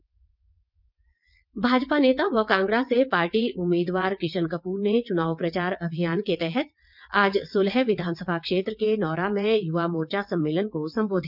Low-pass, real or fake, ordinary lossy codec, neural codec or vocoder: 5.4 kHz; fake; none; vocoder, 22.05 kHz, 80 mel bands, WaveNeXt